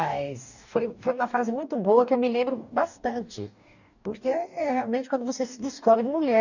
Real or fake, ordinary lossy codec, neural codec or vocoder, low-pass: fake; none; codec, 44.1 kHz, 2.6 kbps, DAC; 7.2 kHz